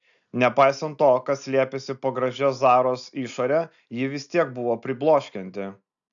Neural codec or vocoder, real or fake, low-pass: none; real; 7.2 kHz